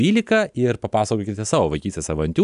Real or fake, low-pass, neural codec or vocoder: real; 10.8 kHz; none